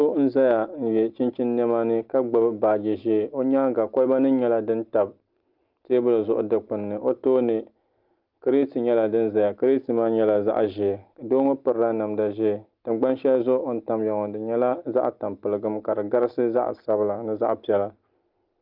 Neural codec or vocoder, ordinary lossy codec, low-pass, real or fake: none; Opus, 32 kbps; 5.4 kHz; real